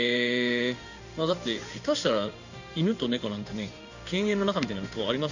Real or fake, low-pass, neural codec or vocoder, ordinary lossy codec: fake; 7.2 kHz; codec, 16 kHz in and 24 kHz out, 1 kbps, XY-Tokenizer; none